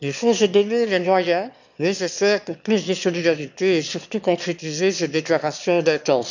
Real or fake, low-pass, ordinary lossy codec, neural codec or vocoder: fake; 7.2 kHz; none; autoencoder, 22.05 kHz, a latent of 192 numbers a frame, VITS, trained on one speaker